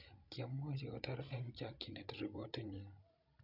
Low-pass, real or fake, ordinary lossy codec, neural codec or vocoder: 5.4 kHz; fake; none; codec, 16 kHz, 16 kbps, FreqCodec, larger model